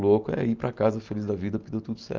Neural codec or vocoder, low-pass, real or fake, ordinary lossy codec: none; 7.2 kHz; real; Opus, 24 kbps